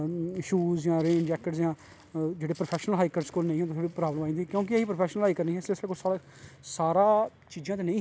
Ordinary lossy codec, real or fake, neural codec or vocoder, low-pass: none; real; none; none